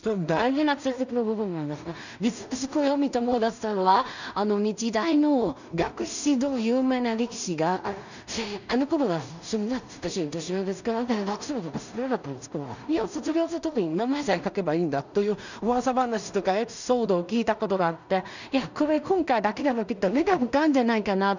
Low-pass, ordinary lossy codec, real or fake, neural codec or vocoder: 7.2 kHz; none; fake; codec, 16 kHz in and 24 kHz out, 0.4 kbps, LongCat-Audio-Codec, two codebook decoder